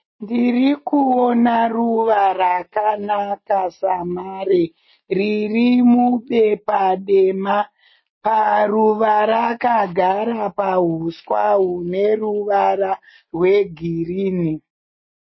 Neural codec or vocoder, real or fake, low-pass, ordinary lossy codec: none; real; 7.2 kHz; MP3, 24 kbps